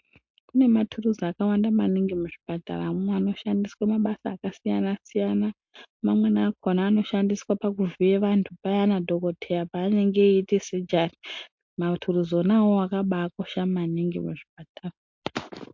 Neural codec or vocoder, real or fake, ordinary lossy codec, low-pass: none; real; MP3, 48 kbps; 7.2 kHz